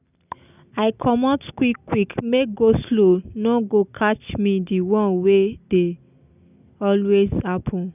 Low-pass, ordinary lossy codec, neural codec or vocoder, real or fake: 3.6 kHz; none; none; real